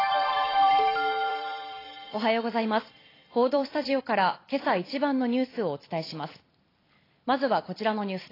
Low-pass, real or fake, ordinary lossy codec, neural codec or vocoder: 5.4 kHz; fake; AAC, 24 kbps; vocoder, 44.1 kHz, 128 mel bands every 256 samples, BigVGAN v2